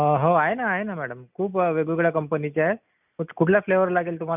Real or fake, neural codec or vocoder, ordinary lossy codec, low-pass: real; none; none; 3.6 kHz